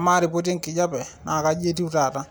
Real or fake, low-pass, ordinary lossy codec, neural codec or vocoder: real; none; none; none